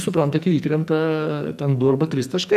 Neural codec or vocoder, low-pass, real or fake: codec, 44.1 kHz, 2.6 kbps, SNAC; 14.4 kHz; fake